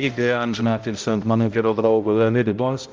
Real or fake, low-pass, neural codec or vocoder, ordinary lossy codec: fake; 7.2 kHz; codec, 16 kHz, 0.5 kbps, X-Codec, HuBERT features, trained on balanced general audio; Opus, 24 kbps